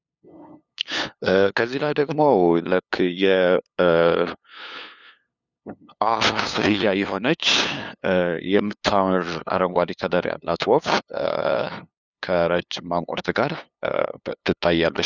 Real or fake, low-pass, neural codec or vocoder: fake; 7.2 kHz; codec, 16 kHz, 2 kbps, FunCodec, trained on LibriTTS, 25 frames a second